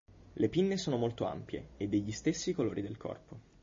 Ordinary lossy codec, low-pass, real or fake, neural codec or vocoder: MP3, 32 kbps; 7.2 kHz; real; none